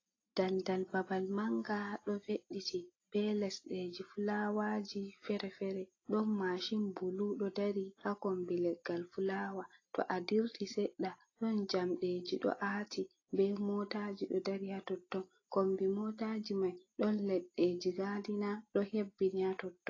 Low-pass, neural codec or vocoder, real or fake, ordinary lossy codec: 7.2 kHz; none; real; AAC, 32 kbps